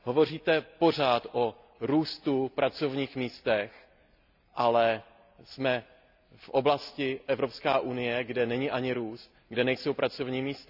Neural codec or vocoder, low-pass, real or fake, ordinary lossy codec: none; 5.4 kHz; real; none